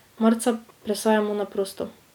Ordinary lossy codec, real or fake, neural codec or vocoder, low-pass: none; real; none; 19.8 kHz